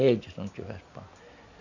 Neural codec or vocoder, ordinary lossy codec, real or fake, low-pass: none; none; real; 7.2 kHz